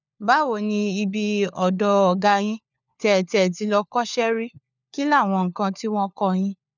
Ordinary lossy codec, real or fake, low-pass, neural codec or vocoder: none; fake; 7.2 kHz; codec, 16 kHz, 4 kbps, FunCodec, trained on LibriTTS, 50 frames a second